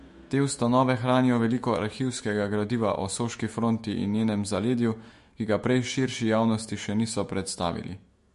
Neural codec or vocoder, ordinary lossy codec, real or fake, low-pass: autoencoder, 48 kHz, 128 numbers a frame, DAC-VAE, trained on Japanese speech; MP3, 48 kbps; fake; 14.4 kHz